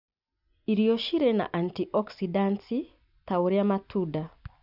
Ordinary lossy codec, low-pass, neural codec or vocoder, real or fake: none; 5.4 kHz; none; real